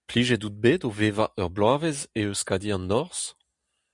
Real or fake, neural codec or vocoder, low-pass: real; none; 10.8 kHz